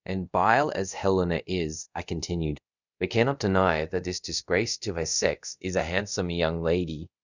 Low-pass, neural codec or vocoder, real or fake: 7.2 kHz; codec, 24 kHz, 0.5 kbps, DualCodec; fake